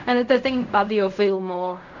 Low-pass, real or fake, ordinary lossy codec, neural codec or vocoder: 7.2 kHz; fake; none; codec, 16 kHz in and 24 kHz out, 0.4 kbps, LongCat-Audio-Codec, fine tuned four codebook decoder